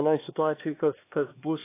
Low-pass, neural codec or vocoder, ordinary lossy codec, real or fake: 3.6 kHz; codec, 16 kHz, 2 kbps, X-Codec, HuBERT features, trained on LibriSpeech; AAC, 24 kbps; fake